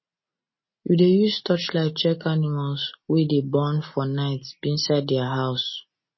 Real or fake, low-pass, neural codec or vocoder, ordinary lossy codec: real; 7.2 kHz; none; MP3, 24 kbps